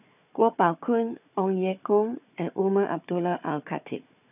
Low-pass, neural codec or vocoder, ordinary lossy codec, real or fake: 3.6 kHz; codec, 16 kHz, 4 kbps, FunCodec, trained on Chinese and English, 50 frames a second; none; fake